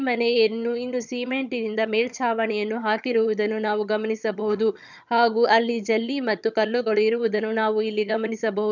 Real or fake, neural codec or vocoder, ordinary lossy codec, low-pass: fake; vocoder, 22.05 kHz, 80 mel bands, HiFi-GAN; none; 7.2 kHz